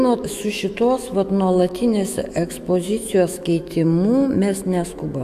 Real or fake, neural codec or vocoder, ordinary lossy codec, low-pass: fake; autoencoder, 48 kHz, 128 numbers a frame, DAC-VAE, trained on Japanese speech; AAC, 96 kbps; 14.4 kHz